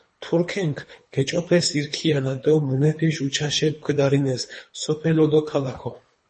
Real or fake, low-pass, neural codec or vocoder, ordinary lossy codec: fake; 10.8 kHz; codec, 24 kHz, 3 kbps, HILCodec; MP3, 32 kbps